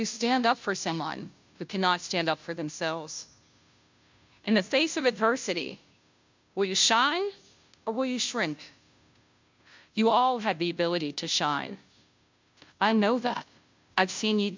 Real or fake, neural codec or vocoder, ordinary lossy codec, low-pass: fake; codec, 16 kHz, 0.5 kbps, FunCodec, trained on Chinese and English, 25 frames a second; MP3, 64 kbps; 7.2 kHz